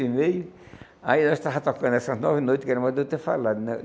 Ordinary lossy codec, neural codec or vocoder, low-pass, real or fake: none; none; none; real